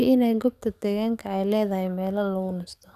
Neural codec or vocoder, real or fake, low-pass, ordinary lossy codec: codec, 44.1 kHz, 7.8 kbps, DAC; fake; 14.4 kHz; none